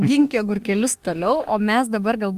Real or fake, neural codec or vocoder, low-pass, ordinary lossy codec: fake; autoencoder, 48 kHz, 32 numbers a frame, DAC-VAE, trained on Japanese speech; 14.4 kHz; Opus, 32 kbps